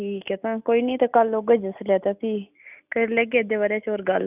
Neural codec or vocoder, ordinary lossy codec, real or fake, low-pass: none; none; real; 3.6 kHz